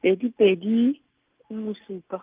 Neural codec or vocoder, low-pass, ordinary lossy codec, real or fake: none; 3.6 kHz; Opus, 24 kbps; real